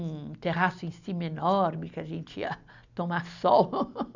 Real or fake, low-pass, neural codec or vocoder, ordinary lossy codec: real; 7.2 kHz; none; none